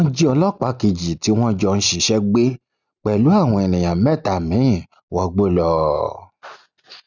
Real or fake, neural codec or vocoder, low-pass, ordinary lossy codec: real; none; 7.2 kHz; none